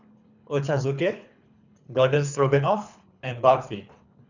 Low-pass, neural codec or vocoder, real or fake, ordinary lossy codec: 7.2 kHz; codec, 24 kHz, 3 kbps, HILCodec; fake; none